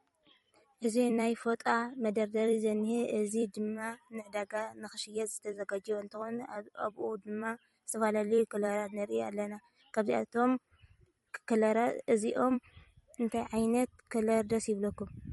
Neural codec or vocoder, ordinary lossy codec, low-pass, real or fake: vocoder, 44.1 kHz, 128 mel bands every 256 samples, BigVGAN v2; MP3, 48 kbps; 19.8 kHz; fake